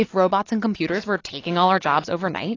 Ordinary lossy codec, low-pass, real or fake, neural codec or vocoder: AAC, 32 kbps; 7.2 kHz; real; none